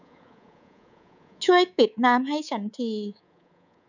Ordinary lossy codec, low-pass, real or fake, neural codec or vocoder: none; 7.2 kHz; fake; codec, 24 kHz, 3.1 kbps, DualCodec